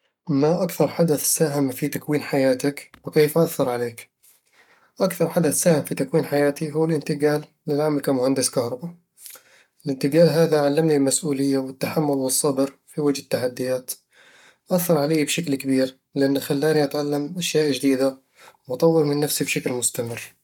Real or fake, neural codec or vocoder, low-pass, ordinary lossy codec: fake; codec, 44.1 kHz, 7.8 kbps, Pupu-Codec; 19.8 kHz; none